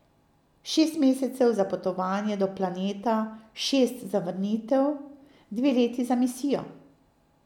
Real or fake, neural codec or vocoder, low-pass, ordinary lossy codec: real; none; 19.8 kHz; none